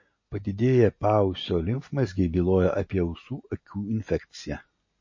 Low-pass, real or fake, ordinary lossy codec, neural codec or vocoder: 7.2 kHz; real; MP3, 32 kbps; none